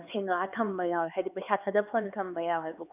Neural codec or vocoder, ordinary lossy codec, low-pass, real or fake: codec, 16 kHz, 4 kbps, X-Codec, HuBERT features, trained on LibriSpeech; none; 3.6 kHz; fake